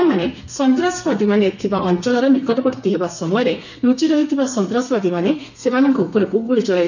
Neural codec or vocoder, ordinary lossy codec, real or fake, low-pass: codec, 32 kHz, 1.9 kbps, SNAC; none; fake; 7.2 kHz